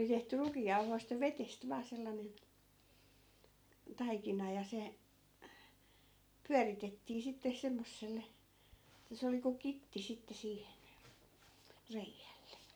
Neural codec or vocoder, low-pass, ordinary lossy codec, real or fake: none; none; none; real